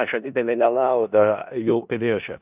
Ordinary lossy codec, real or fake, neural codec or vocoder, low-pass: Opus, 64 kbps; fake; codec, 16 kHz in and 24 kHz out, 0.4 kbps, LongCat-Audio-Codec, four codebook decoder; 3.6 kHz